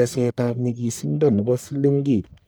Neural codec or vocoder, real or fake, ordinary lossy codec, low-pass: codec, 44.1 kHz, 1.7 kbps, Pupu-Codec; fake; none; none